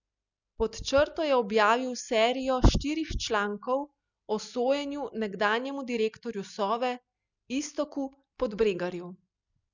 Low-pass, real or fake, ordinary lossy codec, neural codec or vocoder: 7.2 kHz; real; none; none